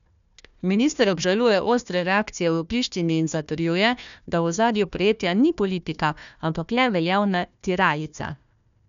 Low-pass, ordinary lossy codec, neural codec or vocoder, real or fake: 7.2 kHz; none; codec, 16 kHz, 1 kbps, FunCodec, trained on Chinese and English, 50 frames a second; fake